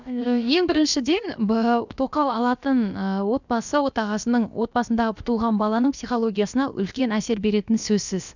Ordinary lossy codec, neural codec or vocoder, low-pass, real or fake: none; codec, 16 kHz, about 1 kbps, DyCAST, with the encoder's durations; 7.2 kHz; fake